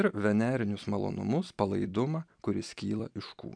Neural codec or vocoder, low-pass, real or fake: vocoder, 44.1 kHz, 128 mel bands every 256 samples, BigVGAN v2; 9.9 kHz; fake